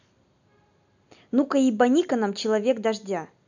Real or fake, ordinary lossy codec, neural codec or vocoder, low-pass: real; none; none; 7.2 kHz